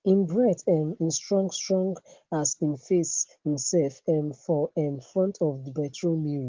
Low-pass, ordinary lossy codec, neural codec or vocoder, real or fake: 7.2 kHz; Opus, 16 kbps; none; real